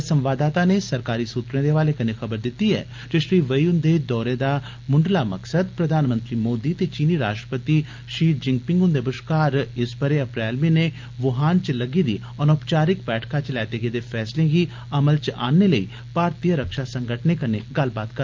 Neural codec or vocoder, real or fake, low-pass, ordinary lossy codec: none; real; 7.2 kHz; Opus, 24 kbps